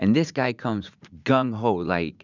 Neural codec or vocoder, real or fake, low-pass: vocoder, 44.1 kHz, 80 mel bands, Vocos; fake; 7.2 kHz